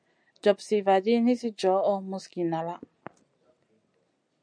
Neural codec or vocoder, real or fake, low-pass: none; real; 9.9 kHz